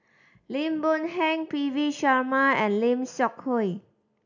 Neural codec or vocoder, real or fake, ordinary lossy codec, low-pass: none; real; none; 7.2 kHz